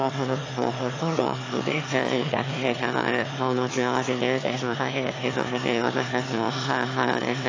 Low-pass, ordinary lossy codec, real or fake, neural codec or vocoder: 7.2 kHz; AAC, 32 kbps; fake; autoencoder, 22.05 kHz, a latent of 192 numbers a frame, VITS, trained on one speaker